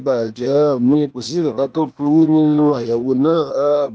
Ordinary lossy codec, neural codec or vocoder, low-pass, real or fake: none; codec, 16 kHz, 0.8 kbps, ZipCodec; none; fake